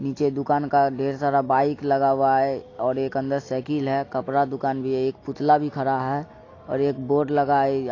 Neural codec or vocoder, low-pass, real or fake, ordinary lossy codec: none; 7.2 kHz; real; AAC, 32 kbps